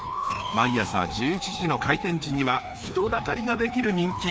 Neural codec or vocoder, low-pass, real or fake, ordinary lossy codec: codec, 16 kHz, 2 kbps, FreqCodec, larger model; none; fake; none